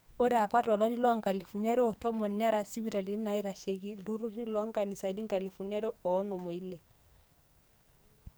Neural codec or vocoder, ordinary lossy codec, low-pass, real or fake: codec, 44.1 kHz, 2.6 kbps, SNAC; none; none; fake